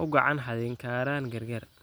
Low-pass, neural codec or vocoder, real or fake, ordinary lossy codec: none; none; real; none